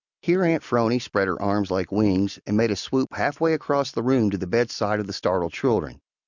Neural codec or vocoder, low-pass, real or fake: none; 7.2 kHz; real